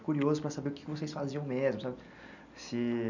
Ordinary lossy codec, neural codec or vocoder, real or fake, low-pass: none; none; real; 7.2 kHz